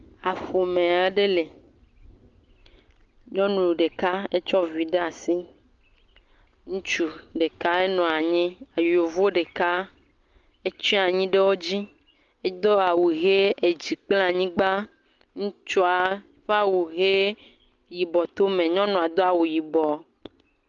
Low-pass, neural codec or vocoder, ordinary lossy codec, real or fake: 7.2 kHz; none; Opus, 32 kbps; real